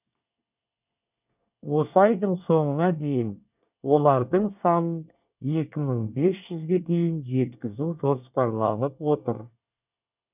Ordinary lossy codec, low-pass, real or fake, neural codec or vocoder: none; 3.6 kHz; fake; codec, 24 kHz, 1 kbps, SNAC